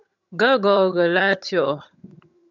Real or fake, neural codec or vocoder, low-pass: fake; vocoder, 22.05 kHz, 80 mel bands, HiFi-GAN; 7.2 kHz